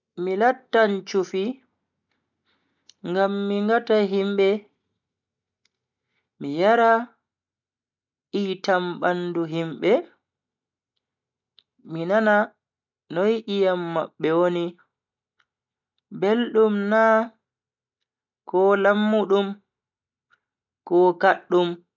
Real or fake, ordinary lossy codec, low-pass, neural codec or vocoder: real; none; 7.2 kHz; none